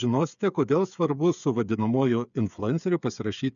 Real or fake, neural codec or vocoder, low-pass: fake; codec, 16 kHz, 8 kbps, FreqCodec, smaller model; 7.2 kHz